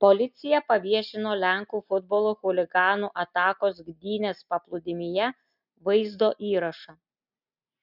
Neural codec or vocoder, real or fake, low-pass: none; real; 5.4 kHz